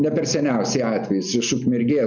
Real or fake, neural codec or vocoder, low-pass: real; none; 7.2 kHz